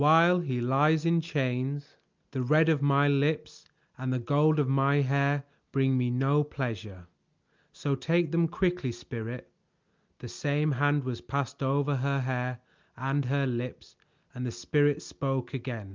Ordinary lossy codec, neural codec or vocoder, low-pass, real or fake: Opus, 24 kbps; none; 7.2 kHz; real